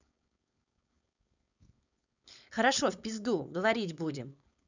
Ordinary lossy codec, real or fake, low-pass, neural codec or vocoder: none; fake; 7.2 kHz; codec, 16 kHz, 4.8 kbps, FACodec